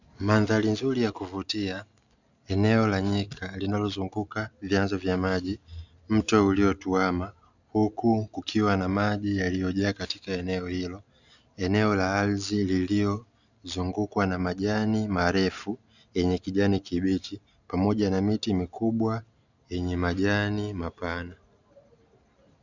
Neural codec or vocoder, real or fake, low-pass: none; real; 7.2 kHz